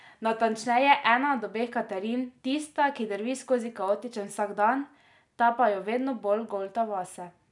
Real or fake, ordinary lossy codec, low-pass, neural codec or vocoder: real; none; 10.8 kHz; none